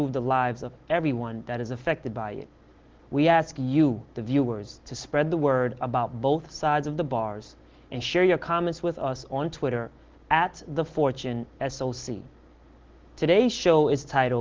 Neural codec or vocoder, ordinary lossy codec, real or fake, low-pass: none; Opus, 16 kbps; real; 7.2 kHz